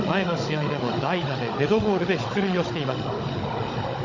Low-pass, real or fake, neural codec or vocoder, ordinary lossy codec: 7.2 kHz; fake; codec, 16 kHz, 16 kbps, FunCodec, trained on Chinese and English, 50 frames a second; MP3, 48 kbps